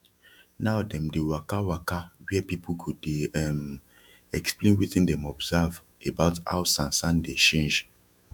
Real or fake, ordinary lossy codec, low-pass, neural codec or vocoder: fake; none; 19.8 kHz; autoencoder, 48 kHz, 128 numbers a frame, DAC-VAE, trained on Japanese speech